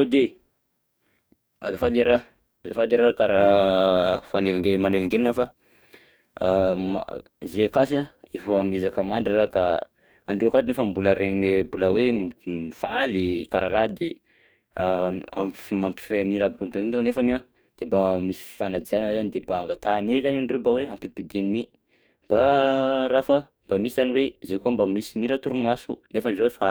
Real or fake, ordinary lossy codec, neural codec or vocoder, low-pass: fake; none; codec, 44.1 kHz, 2.6 kbps, DAC; none